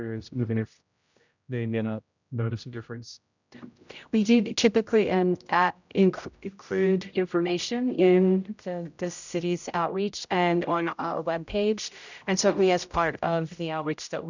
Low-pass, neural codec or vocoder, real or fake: 7.2 kHz; codec, 16 kHz, 0.5 kbps, X-Codec, HuBERT features, trained on general audio; fake